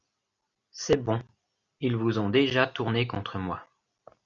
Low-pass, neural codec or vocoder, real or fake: 7.2 kHz; none; real